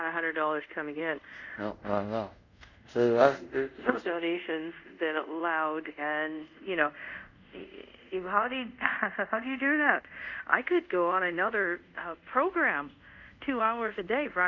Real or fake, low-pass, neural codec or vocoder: fake; 7.2 kHz; codec, 24 kHz, 0.5 kbps, DualCodec